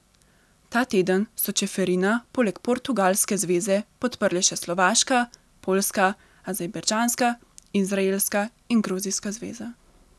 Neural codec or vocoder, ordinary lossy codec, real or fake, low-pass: none; none; real; none